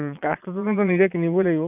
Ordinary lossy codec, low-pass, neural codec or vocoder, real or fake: none; 3.6 kHz; vocoder, 22.05 kHz, 80 mel bands, Vocos; fake